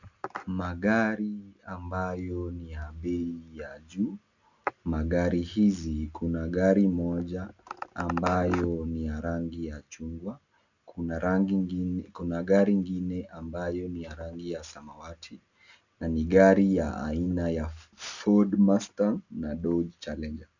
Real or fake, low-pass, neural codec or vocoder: real; 7.2 kHz; none